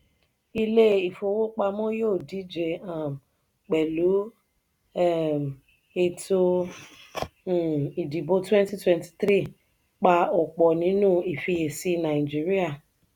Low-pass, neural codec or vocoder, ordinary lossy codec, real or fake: 19.8 kHz; none; none; real